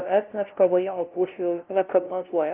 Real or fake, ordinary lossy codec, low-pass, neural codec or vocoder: fake; Opus, 32 kbps; 3.6 kHz; codec, 16 kHz, 0.5 kbps, FunCodec, trained on LibriTTS, 25 frames a second